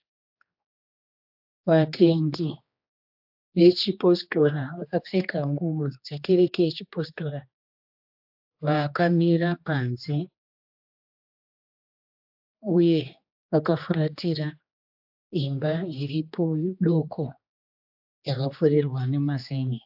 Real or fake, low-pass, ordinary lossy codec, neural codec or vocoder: fake; 5.4 kHz; AAC, 48 kbps; codec, 16 kHz, 2 kbps, X-Codec, HuBERT features, trained on general audio